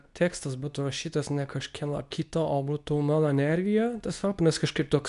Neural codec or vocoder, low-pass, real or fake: codec, 24 kHz, 0.9 kbps, WavTokenizer, medium speech release version 2; 10.8 kHz; fake